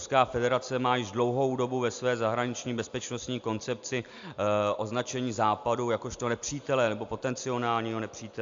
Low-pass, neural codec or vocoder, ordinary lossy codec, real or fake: 7.2 kHz; none; AAC, 64 kbps; real